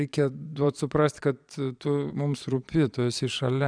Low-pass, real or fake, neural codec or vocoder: 9.9 kHz; fake; vocoder, 22.05 kHz, 80 mel bands, Vocos